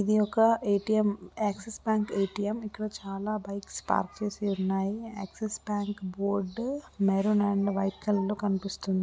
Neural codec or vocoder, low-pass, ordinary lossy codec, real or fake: none; none; none; real